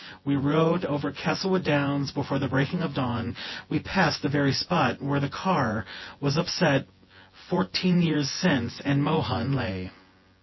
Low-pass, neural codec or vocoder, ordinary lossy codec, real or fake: 7.2 kHz; vocoder, 24 kHz, 100 mel bands, Vocos; MP3, 24 kbps; fake